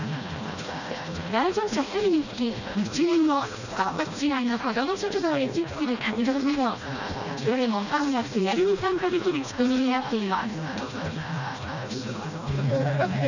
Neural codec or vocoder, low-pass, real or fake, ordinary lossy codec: codec, 16 kHz, 1 kbps, FreqCodec, smaller model; 7.2 kHz; fake; none